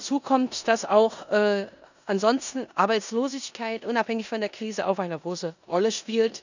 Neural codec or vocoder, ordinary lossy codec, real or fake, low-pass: codec, 16 kHz in and 24 kHz out, 0.9 kbps, LongCat-Audio-Codec, four codebook decoder; none; fake; 7.2 kHz